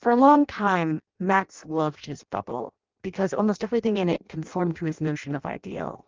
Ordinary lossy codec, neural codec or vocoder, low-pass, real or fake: Opus, 32 kbps; codec, 16 kHz in and 24 kHz out, 0.6 kbps, FireRedTTS-2 codec; 7.2 kHz; fake